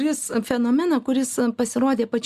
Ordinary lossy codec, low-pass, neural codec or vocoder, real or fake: Opus, 64 kbps; 14.4 kHz; none; real